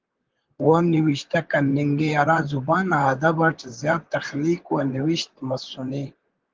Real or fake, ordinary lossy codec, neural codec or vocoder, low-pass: fake; Opus, 16 kbps; vocoder, 44.1 kHz, 128 mel bands, Pupu-Vocoder; 7.2 kHz